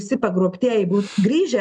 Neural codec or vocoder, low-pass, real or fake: none; 10.8 kHz; real